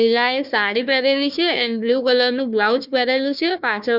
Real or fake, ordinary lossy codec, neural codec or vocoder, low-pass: fake; none; codec, 16 kHz, 1 kbps, FunCodec, trained on Chinese and English, 50 frames a second; 5.4 kHz